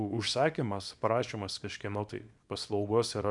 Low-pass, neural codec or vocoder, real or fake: 10.8 kHz; codec, 24 kHz, 0.9 kbps, WavTokenizer, small release; fake